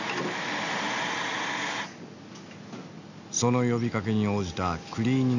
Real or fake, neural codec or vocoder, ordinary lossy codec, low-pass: real; none; none; 7.2 kHz